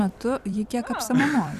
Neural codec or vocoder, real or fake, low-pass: vocoder, 44.1 kHz, 128 mel bands every 256 samples, BigVGAN v2; fake; 14.4 kHz